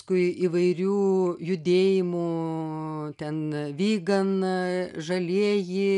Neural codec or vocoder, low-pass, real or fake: none; 10.8 kHz; real